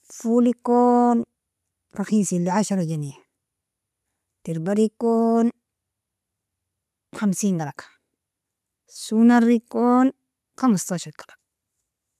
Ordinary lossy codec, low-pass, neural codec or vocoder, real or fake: none; 14.4 kHz; none; real